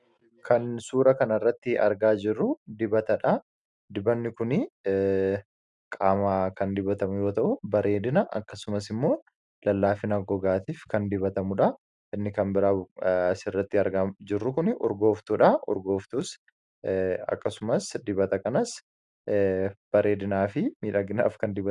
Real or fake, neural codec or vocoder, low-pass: real; none; 10.8 kHz